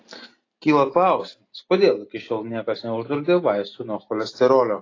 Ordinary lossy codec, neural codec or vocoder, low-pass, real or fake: AAC, 32 kbps; none; 7.2 kHz; real